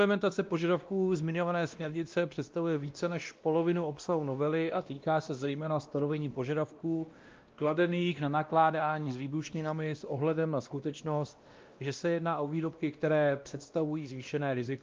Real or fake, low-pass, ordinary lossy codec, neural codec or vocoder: fake; 7.2 kHz; Opus, 24 kbps; codec, 16 kHz, 1 kbps, X-Codec, WavLM features, trained on Multilingual LibriSpeech